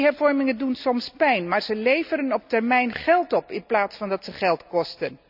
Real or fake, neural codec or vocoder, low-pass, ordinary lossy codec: real; none; 5.4 kHz; none